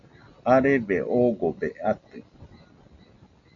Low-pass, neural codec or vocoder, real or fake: 7.2 kHz; none; real